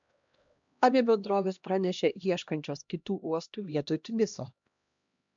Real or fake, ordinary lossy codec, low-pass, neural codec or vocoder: fake; MP3, 64 kbps; 7.2 kHz; codec, 16 kHz, 1 kbps, X-Codec, HuBERT features, trained on LibriSpeech